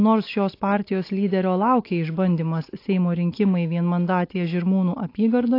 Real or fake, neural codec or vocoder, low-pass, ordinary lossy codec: real; none; 5.4 kHz; AAC, 32 kbps